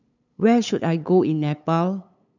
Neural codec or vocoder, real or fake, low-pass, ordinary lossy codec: codec, 16 kHz, 8 kbps, FunCodec, trained on LibriTTS, 25 frames a second; fake; 7.2 kHz; none